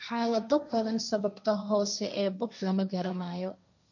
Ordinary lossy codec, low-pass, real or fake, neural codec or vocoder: none; 7.2 kHz; fake; codec, 16 kHz, 1.1 kbps, Voila-Tokenizer